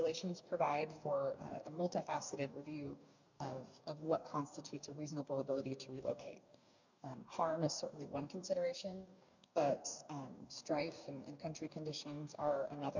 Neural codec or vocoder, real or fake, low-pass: codec, 44.1 kHz, 2.6 kbps, DAC; fake; 7.2 kHz